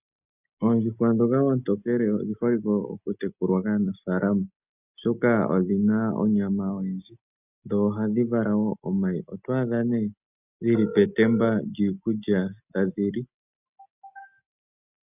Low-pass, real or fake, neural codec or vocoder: 3.6 kHz; real; none